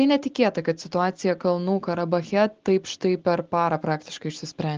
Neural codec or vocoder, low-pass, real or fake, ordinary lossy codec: none; 7.2 kHz; real; Opus, 16 kbps